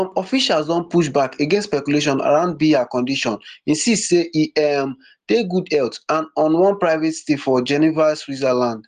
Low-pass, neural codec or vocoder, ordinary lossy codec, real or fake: 14.4 kHz; none; Opus, 24 kbps; real